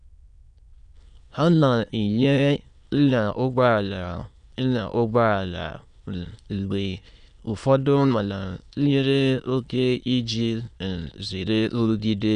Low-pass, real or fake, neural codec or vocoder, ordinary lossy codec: 9.9 kHz; fake; autoencoder, 22.05 kHz, a latent of 192 numbers a frame, VITS, trained on many speakers; none